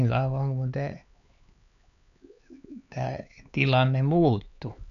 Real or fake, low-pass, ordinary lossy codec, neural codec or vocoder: fake; 7.2 kHz; none; codec, 16 kHz, 4 kbps, X-Codec, HuBERT features, trained on LibriSpeech